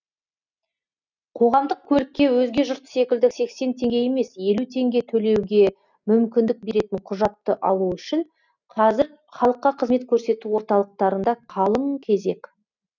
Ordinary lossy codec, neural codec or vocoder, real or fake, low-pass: none; none; real; 7.2 kHz